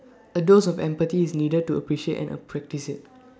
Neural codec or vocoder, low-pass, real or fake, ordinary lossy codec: none; none; real; none